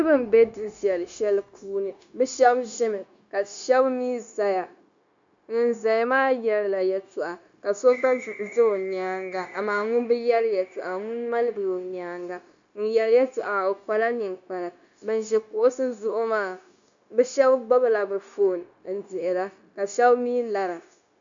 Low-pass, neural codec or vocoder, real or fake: 7.2 kHz; codec, 16 kHz, 0.9 kbps, LongCat-Audio-Codec; fake